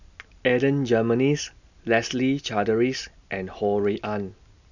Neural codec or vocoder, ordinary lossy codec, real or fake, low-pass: none; none; real; 7.2 kHz